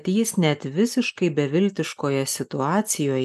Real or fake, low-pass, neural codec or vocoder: real; 14.4 kHz; none